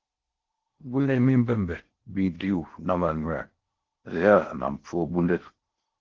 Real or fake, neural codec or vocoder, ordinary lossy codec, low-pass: fake; codec, 16 kHz in and 24 kHz out, 0.6 kbps, FocalCodec, streaming, 4096 codes; Opus, 16 kbps; 7.2 kHz